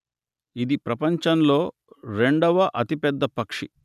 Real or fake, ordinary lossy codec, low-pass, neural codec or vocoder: real; none; 14.4 kHz; none